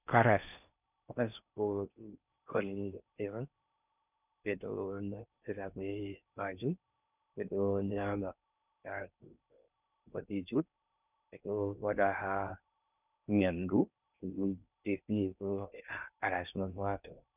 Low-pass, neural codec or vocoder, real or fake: 3.6 kHz; codec, 16 kHz in and 24 kHz out, 0.6 kbps, FocalCodec, streaming, 4096 codes; fake